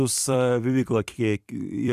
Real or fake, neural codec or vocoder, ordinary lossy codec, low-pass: fake; vocoder, 44.1 kHz, 128 mel bands every 256 samples, BigVGAN v2; AAC, 96 kbps; 14.4 kHz